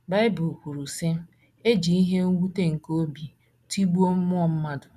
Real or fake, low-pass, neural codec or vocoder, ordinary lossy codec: real; 14.4 kHz; none; none